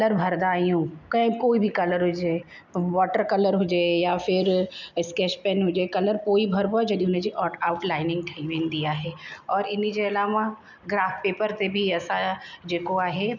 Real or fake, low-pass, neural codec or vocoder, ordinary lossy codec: real; 7.2 kHz; none; none